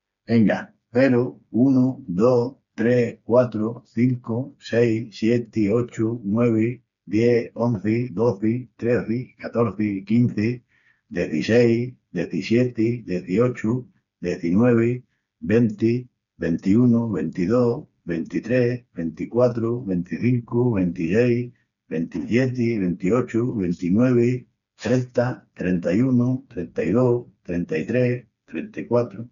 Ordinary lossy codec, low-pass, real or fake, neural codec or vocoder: none; 7.2 kHz; fake; codec, 16 kHz, 4 kbps, FreqCodec, smaller model